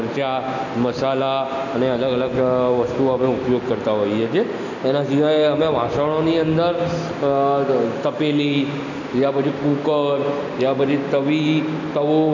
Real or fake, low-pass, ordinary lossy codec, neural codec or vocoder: real; 7.2 kHz; none; none